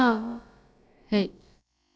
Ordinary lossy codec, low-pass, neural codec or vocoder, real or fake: none; none; codec, 16 kHz, about 1 kbps, DyCAST, with the encoder's durations; fake